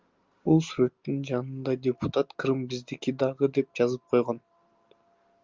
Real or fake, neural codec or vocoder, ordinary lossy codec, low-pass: real; none; Opus, 32 kbps; 7.2 kHz